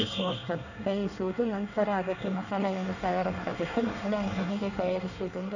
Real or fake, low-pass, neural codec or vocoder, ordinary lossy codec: fake; 7.2 kHz; codec, 24 kHz, 1 kbps, SNAC; none